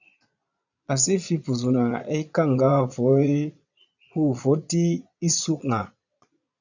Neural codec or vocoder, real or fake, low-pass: vocoder, 22.05 kHz, 80 mel bands, Vocos; fake; 7.2 kHz